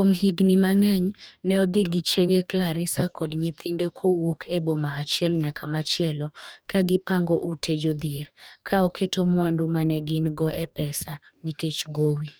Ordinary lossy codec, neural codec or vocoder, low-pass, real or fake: none; codec, 44.1 kHz, 2.6 kbps, DAC; none; fake